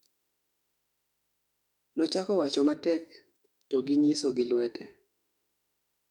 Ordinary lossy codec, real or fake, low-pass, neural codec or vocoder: none; fake; 19.8 kHz; autoencoder, 48 kHz, 32 numbers a frame, DAC-VAE, trained on Japanese speech